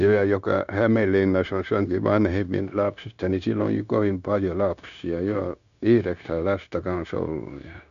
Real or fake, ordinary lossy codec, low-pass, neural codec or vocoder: fake; none; 7.2 kHz; codec, 16 kHz, 0.9 kbps, LongCat-Audio-Codec